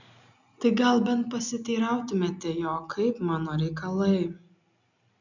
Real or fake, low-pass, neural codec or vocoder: real; 7.2 kHz; none